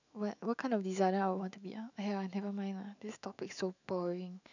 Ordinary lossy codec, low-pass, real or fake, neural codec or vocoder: none; 7.2 kHz; fake; autoencoder, 48 kHz, 128 numbers a frame, DAC-VAE, trained on Japanese speech